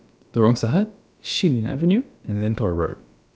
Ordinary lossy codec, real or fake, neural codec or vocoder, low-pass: none; fake; codec, 16 kHz, about 1 kbps, DyCAST, with the encoder's durations; none